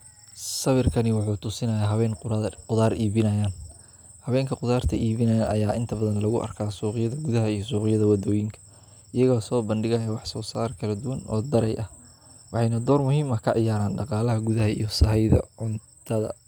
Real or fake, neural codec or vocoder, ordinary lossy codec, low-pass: real; none; none; none